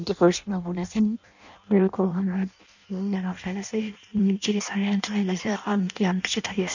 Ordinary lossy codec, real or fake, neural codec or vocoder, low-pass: none; fake; codec, 16 kHz in and 24 kHz out, 0.6 kbps, FireRedTTS-2 codec; 7.2 kHz